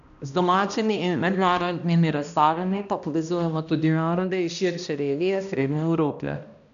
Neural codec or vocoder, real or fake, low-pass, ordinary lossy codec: codec, 16 kHz, 1 kbps, X-Codec, HuBERT features, trained on balanced general audio; fake; 7.2 kHz; none